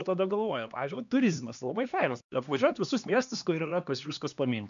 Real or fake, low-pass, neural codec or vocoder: fake; 7.2 kHz; codec, 16 kHz, 2 kbps, X-Codec, HuBERT features, trained on LibriSpeech